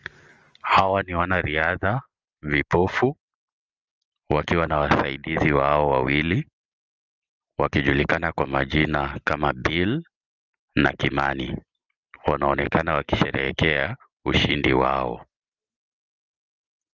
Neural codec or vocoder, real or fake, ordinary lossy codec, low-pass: none; real; Opus, 24 kbps; 7.2 kHz